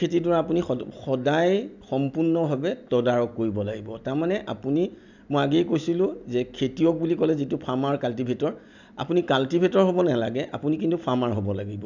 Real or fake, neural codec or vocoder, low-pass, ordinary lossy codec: real; none; 7.2 kHz; none